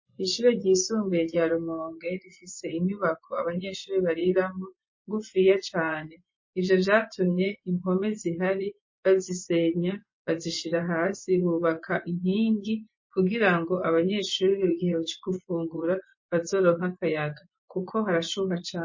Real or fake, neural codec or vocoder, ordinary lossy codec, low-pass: fake; vocoder, 24 kHz, 100 mel bands, Vocos; MP3, 32 kbps; 7.2 kHz